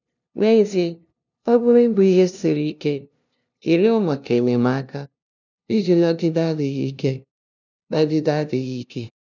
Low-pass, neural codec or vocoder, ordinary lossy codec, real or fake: 7.2 kHz; codec, 16 kHz, 0.5 kbps, FunCodec, trained on LibriTTS, 25 frames a second; none; fake